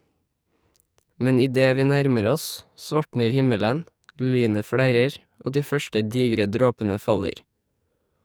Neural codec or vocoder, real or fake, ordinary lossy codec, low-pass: codec, 44.1 kHz, 2.6 kbps, SNAC; fake; none; none